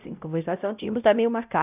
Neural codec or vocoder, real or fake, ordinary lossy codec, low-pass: codec, 16 kHz, 0.5 kbps, X-Codec, WavLM features, trained on Multilingual LibriSpeech; fake; none; 3.6 kHz